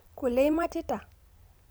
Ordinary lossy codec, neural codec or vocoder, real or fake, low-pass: none; vocoder, 44.1 kHz, 128 mel bands every 256 samples, BigVGAN v2; fake; none